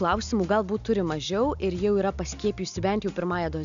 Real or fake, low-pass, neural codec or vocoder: real; 7.2 kHz; none